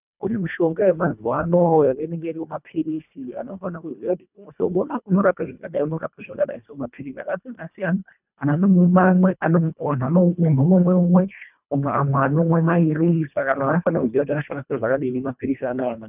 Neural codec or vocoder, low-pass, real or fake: codec, 24 kHz, 1.5 kbps, HILCodec; 3.6 kHz; fake